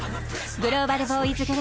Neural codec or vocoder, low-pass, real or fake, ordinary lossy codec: none; none; real; none